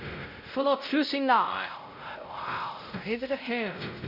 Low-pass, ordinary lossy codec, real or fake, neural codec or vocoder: 5.4 kHz; none; fake; codec, 16 kHz, 0.5 kbps, X-Codec, WavLM features, trained on Multilingual LibriSpeech